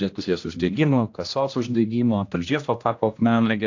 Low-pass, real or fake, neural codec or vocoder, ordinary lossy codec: 7.2 kHz; fake; codec, 16 kHz, 1 kbps, X-Codec, HuBERT features, trained on general audio; AAC, 48 kbps